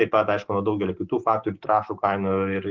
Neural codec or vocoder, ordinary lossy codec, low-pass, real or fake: none; Opus, 16 kbps; 7.2 kHz; real